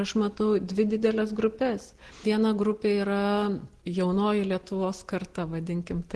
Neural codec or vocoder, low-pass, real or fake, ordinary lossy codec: none; 10.8 kHz; real; Opus, 16 kbps